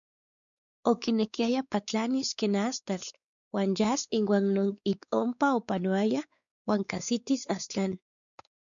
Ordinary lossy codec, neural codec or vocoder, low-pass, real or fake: MP3, 96 kbps; codec, 16 kHz, 4 kbps, X-Codec, WavLM features, trained on Multilingual LibriSpeech; 7.2 kHz; fake